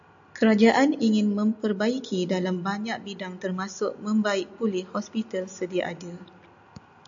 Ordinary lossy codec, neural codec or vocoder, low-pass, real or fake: AAC, 64 kbps; none; 7.2 kHz; real